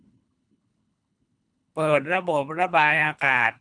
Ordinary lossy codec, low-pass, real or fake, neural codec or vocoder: none; 9.9 kHz; fake; codec, 24 kHz, 3 kbps, HILCodec